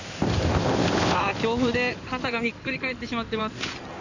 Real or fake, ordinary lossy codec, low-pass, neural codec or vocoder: fake; none; 7.2 kHz; codec, 16 kHz in and 24 kHz out, 2.2 kbps, FireRedTTS-2 codec